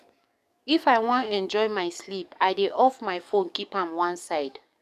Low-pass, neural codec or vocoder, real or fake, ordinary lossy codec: 14.4 kHz; codec, 44.1 kHz, 7.8 kbps, DAC; fake; none